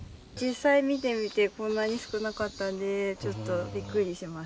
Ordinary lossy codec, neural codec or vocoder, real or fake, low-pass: none; none; real; none